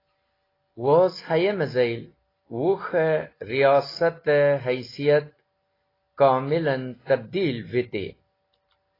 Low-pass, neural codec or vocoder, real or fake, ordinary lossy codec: 5.4 kHz; none; real; AAC, 24 kbps